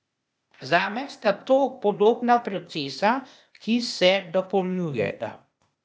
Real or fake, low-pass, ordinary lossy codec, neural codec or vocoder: fake; none; none; codec, 16 kHz, 0.8 kbps, ZipCodec